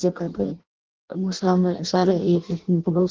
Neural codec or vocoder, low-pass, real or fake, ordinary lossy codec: codec, 16 kHz in and 24 kHz out, 0.6 kbps, FireRedTTS-2 codec; 7.2 kHz; fake; Opus, 16 kbps